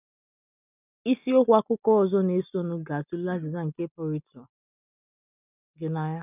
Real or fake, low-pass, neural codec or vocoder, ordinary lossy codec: real; 3.6 kHz; none; none